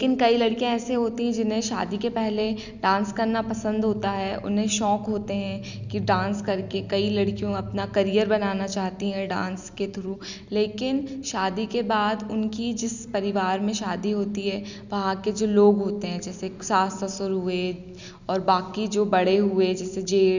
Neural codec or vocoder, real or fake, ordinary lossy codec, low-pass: none; real; none; 7.2 kHz